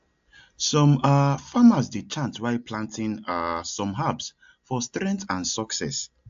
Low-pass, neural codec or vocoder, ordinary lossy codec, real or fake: 7.2 kHz; none; AAC, 64 kbps; real